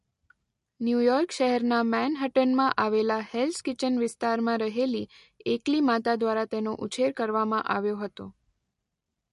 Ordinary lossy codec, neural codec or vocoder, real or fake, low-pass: MP3, 48 kbps; none; real; 14.4 kHz